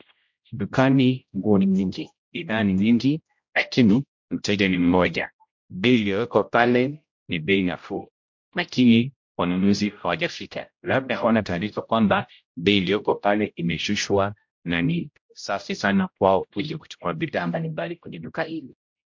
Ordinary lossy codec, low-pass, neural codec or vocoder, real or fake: MP3, 48 kbps; 7.2 kHz; codec, 16 kHz, 0.5 kbps, X-Codec, HuBERT features, trained on general audio; fake